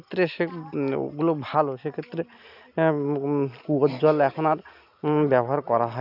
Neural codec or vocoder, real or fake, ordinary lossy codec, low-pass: none; real; none; 5.4 kHz